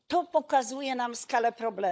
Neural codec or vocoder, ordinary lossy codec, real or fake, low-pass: codec, 16 kHz, 16 kbps, FunCodec, trained on LibriTTS, 50 frames a second; none; fake; none